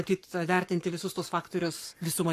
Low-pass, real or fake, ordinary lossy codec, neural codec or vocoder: 14.4 kHz; fake; AAC, 64 kbps; codec, 44.1 kHz, 7.8 kbps, Pupu-Codec